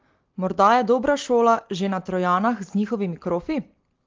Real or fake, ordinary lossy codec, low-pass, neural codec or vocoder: real; Opus, 16 kbps; 7.2 kHz; none